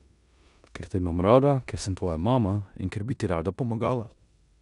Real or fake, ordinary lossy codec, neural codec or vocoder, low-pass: fake; none; codec, 16 kHz in and 24 kHz out, 0.9 kbps, LongCat-Audio-Codec, four codebook decoder; 10.8 kHz